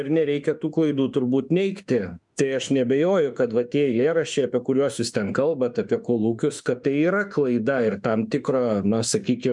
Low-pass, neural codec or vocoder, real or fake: 10.8 kHz; autoencoder, 48 kHz, 32 numbers a frame, DAC-VAE, trained on Japanese speech; fake